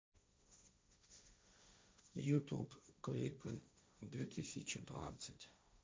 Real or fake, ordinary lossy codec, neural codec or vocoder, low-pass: fake; none; codec, 16 kHz, 1.1 kbps, Voila-Tokenizer; none